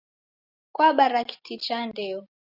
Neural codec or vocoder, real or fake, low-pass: none; real; 5.4 kHz